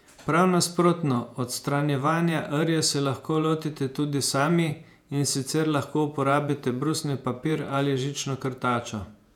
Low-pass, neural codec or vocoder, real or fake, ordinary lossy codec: 19.8 kHz; vocoder, 48 kHz, 128 mel bands, Vocos; fake; none